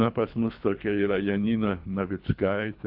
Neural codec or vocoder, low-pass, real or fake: codec, 24 kHz, 3 kbps, HILCodec; 5.4 kHz; fake